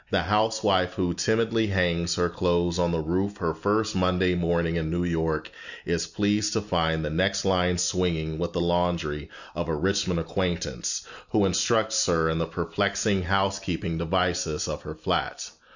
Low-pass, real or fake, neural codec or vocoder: 7.2 kHz; real; none